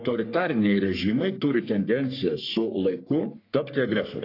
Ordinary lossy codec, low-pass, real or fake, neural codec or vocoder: AAC, 32 kbps; 5.4 kHz; fake; codec, 44.1 kHz, 3.4 kbps, Pupu-Codec